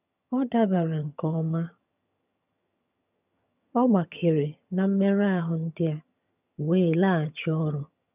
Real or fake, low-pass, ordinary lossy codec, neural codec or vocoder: fake; 3.6 kHz; none; vocoder, 22.05 kHz, 80 mel bands, HiFi-GAN